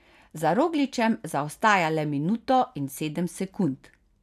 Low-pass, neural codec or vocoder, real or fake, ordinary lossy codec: 14.4 kHz; none; real; none